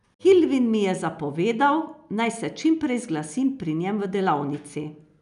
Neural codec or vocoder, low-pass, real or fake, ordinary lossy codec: none; 10.8 kHz; real; none